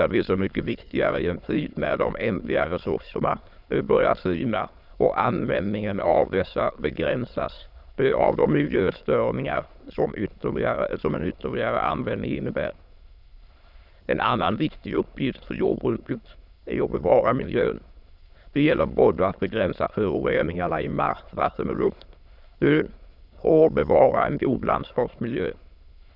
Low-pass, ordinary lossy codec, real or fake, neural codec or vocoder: 5.4 kHz; none; fake; autoencoder, 22.05 kHz, a latent of 192 numbers a frame, VITS, trained on many speakers